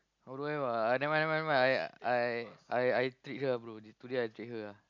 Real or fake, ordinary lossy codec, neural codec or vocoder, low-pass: real; MP3, 64 kbps; none; 7.2 kHz